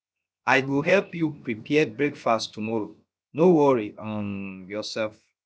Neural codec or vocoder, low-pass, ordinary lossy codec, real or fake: codec, 16 kHz, 0.7 kbps, FocalCodec; none; none; fake